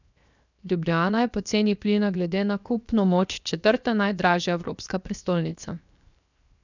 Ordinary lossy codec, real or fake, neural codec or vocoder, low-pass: none; fake; codec, 16 kHz, 0.7 kbps, FocalCodec; 7.2 kHz